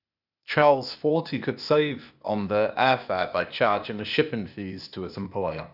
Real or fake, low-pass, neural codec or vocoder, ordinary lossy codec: fake; 5.4 kHz; codec, 16 kHz, 0.8 kbps, ZipCodec; none